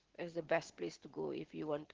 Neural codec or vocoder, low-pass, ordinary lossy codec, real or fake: none; 7.2 kHz; Opus, 16 kbps; real